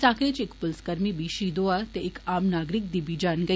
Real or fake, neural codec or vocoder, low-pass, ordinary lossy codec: real; none; none; none